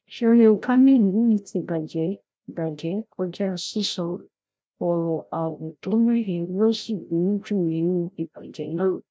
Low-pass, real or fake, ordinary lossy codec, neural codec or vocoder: none; fake; none; codec, 16 kHz, 0.5 kbps, FreqCodec, larger model